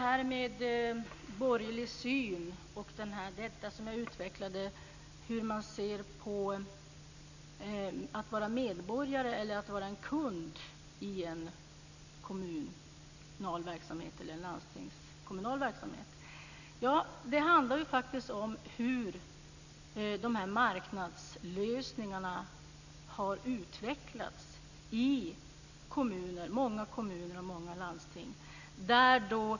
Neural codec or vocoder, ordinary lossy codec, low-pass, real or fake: none; none; 7.2 kHz; real